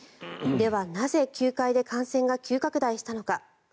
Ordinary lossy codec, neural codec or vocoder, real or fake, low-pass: none; none; real; none